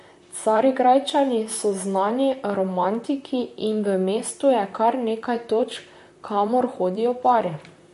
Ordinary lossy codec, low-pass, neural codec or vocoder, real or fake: MP3, 48 kbps; 14.4 kHz; vocoder, 44.1 kHz, 128 mel bands, Pupu-Vocoder; fake